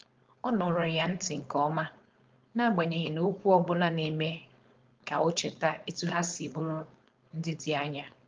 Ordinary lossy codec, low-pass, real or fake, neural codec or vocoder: Opus, 32 kbps; 7.2 kHz; fake; codec, 16 kHz, 4.8 kbps, FACodec